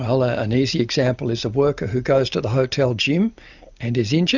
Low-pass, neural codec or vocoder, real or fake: 7.2 kHz; none; real